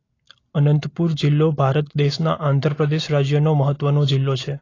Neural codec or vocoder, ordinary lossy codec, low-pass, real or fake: none; AAC, 32 kbps; 7.2 kHz; real